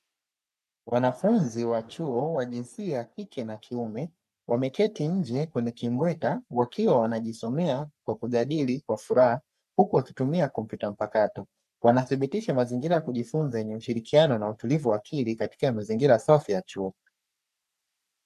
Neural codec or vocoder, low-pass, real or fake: codec, 44.1 kHz, 3.4 kbps, Pupu-Codec; 14.4 kHz; fake